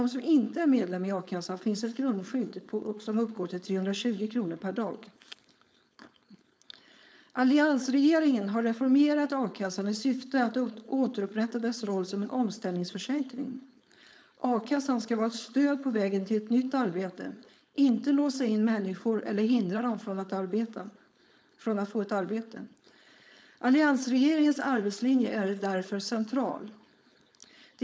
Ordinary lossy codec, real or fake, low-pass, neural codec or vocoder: none; fake; none; codec, 16 kHz, 4.8 kbps, FACodec